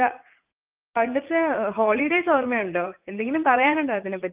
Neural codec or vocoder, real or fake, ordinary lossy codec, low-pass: vocoder, 44.1 kHz, 80 mel bands, Vocos; fake; Opus, 24 kbps; 3.6 kHz